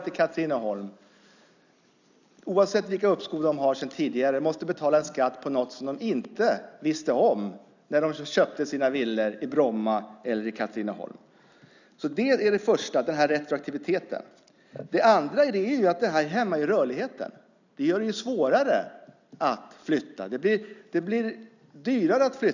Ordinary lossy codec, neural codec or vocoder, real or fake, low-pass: none; none; real; 7.2 kHz